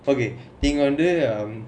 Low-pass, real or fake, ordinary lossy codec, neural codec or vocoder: 9.9 kHz; real; none; none